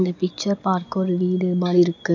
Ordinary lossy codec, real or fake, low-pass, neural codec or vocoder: none; real; 7.2 kHz; none